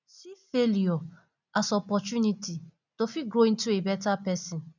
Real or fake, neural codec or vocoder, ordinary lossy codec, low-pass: real; none; none; 7.2 kHz